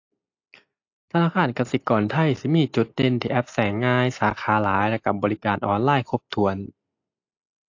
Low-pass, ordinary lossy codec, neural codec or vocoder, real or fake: 7.2 kHz; AAC, 48 kbps; none; real